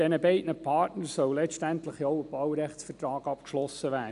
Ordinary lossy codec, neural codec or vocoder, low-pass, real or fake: none; none; 10.8 kHz; real